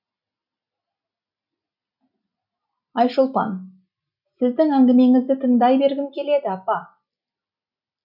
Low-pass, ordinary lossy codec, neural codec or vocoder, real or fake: 5.4 kHz; none; none; real